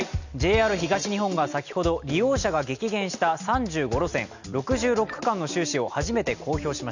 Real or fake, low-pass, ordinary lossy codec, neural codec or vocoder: real; 7.2 kHz; none; none